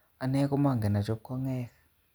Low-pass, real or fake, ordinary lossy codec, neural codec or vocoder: none; real; none; none